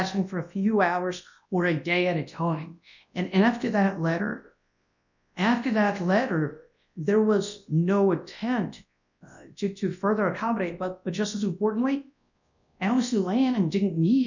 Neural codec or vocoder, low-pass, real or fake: codec, 24 kHz, 0.9 kbps, WavTokenizer, large speech release; 7.2 kHz; fake